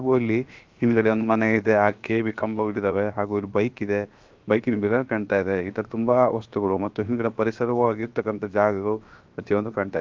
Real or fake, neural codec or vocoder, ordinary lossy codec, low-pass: fake; codec, 16 kHz, 0.7 kbps, FocalCodec; Opus, 24 kbps; 7.2 kHz